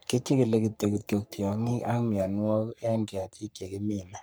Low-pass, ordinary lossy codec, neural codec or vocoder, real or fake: none; none; codec, 44.1 kHz, 3.4 kbps, Pupu-Codec; fake